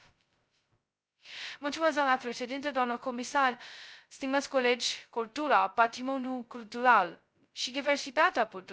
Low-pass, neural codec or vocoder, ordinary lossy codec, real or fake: none; codec, 16 kHz, 0.2 kbps, FocalCodec; none; fake